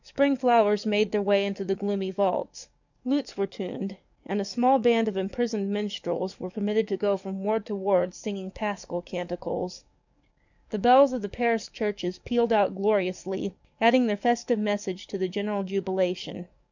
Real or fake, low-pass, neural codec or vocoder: fake; 7.2 kHz; codec, 44.1 kHz, 7.8 kbps, DAC